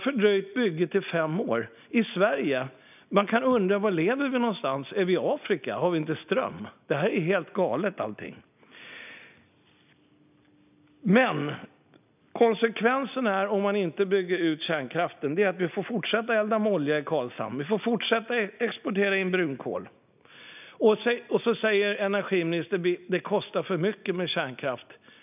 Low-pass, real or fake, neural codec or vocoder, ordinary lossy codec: 3.6 kHz; real; none; none